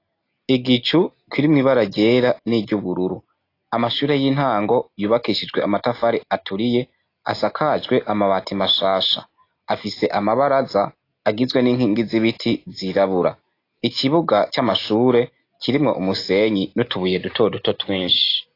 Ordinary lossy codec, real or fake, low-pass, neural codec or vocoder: AAC, 32 kbps; real; 5.4 kHz; none